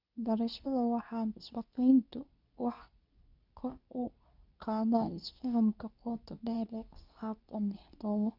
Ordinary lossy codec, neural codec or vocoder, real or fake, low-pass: none; codec, 24 kHz, 0.9 kbps, WavTokenizer, medium speech release version 2; fake; 5.4 kHz